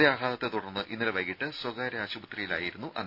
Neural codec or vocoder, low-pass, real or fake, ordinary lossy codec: none; 5.4 kHz; real; none